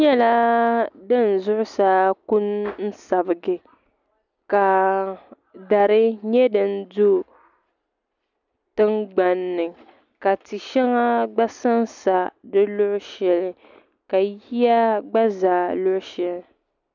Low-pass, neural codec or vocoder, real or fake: 7.2 kHz; none; real